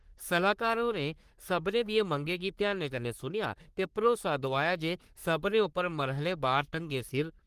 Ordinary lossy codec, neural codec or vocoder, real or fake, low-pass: Opus, 24 kbps; codec, 44.1 kHz, 3.4 kbps, Pupu-Codec; fake; 14.4 kHz